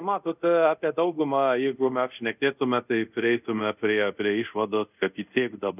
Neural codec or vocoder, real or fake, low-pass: codec, 24 kHz, 0.5 kbps, DualCodec; fake; 3.6 kHz